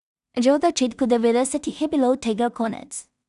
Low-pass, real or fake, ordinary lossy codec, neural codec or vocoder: 10.8 kHz; fake; none; codec, 16 kHz in and 24 kHz out, 0.4 kbps, LongCat-Audio-Codec, two codebook decoder